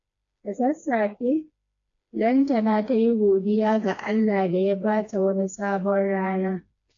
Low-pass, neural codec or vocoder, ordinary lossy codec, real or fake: 7.2 kHz; codec, 16 kHz, 2 kbps, FreqCodec, smaller model; AAC, 48 kbps; fake